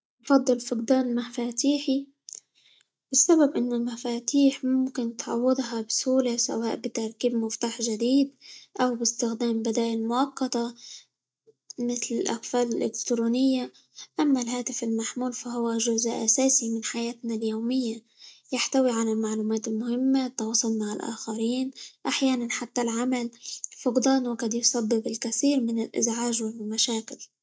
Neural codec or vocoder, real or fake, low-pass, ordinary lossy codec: none; real; none; none